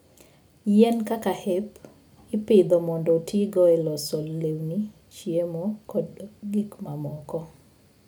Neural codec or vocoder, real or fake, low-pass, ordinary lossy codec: none; real; none; none